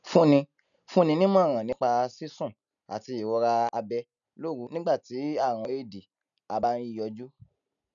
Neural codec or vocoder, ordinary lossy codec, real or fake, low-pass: none; none; real; 7.2 kHz